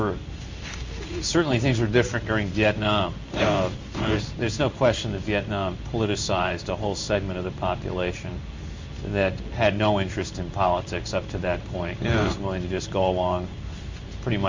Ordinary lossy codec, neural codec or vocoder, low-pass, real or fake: MP3, 64 kbps; codec, 16 kHz in and 24 kHz out, 1 kbps, XY-Tokenizer; 7.2 kHz; fake